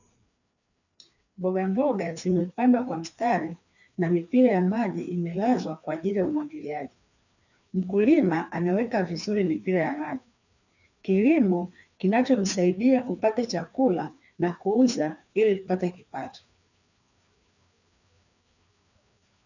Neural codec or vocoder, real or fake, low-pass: codec, 16 kHz, 2 kbps, FreqCodec, larger model; fake; 7.2 kHz